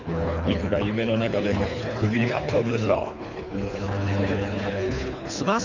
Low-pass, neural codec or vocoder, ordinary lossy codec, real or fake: 7.2 kHz; codec, 24 kHz, 3 kbps, HILCodec; none; fake